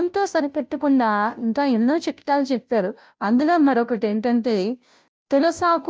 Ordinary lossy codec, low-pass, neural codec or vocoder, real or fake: none; none; codec, 16 kHz, 0.5 kbps, FunCodec, trained on Chinese and English, 25 frames a second; fake